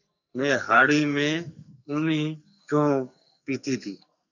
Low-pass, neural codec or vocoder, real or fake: 7.2 kHz; codec, 44.1 kHz, 2.6 kbps, SNAC; fake